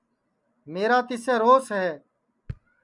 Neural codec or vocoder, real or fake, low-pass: none; real; 10.8 kHz